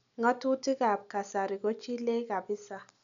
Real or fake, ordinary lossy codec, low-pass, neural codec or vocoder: real; none; 7.2 kHz; none